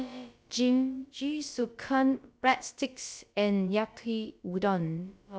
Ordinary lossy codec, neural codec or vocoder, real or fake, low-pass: none; codec, 16 kHz, about 1 kbps, DyCAST, with the encoder's durations; fake; none